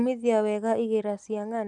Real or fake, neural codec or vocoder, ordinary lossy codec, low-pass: real; none; none; 10.8 kHz